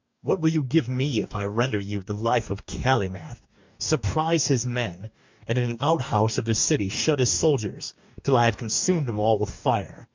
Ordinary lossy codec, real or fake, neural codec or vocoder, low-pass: MP3, 64 kbps; fake; codec, 44.1 kHz, 2.6 kbps, DAC; 7.2 kHz